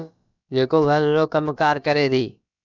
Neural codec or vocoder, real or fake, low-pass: codec, 16 kHz, about 1 kbps, DyCAST, with the encoder's durations; fake; 7.2 kHz